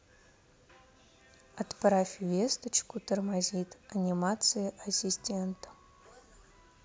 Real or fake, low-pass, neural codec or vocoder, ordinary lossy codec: real; none; none; none